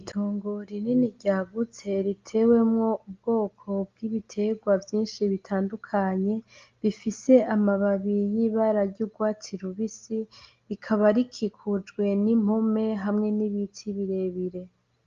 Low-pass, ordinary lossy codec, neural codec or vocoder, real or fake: 7.2 kHz; Opus, 32 kbps; none; real